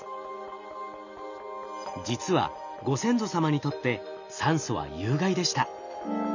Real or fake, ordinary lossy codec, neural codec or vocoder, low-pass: real; none; none; 7.2 kHz